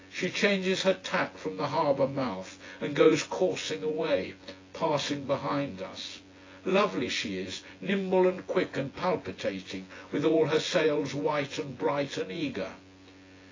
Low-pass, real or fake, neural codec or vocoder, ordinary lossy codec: 7.2 kHz; fake; vocoder, 24 kHz, 100 mel bands, Vocos; AAC, 32 kbps